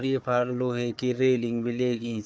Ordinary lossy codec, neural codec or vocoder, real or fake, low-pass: none; codec, 16 kHz, 4 kbps, FunCodec, trained on Chinese and English, 50 frames a second; fake; none